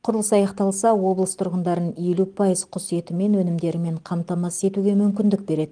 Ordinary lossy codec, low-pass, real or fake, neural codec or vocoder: Opus, 24 kbps; 9.9 kHz; real; none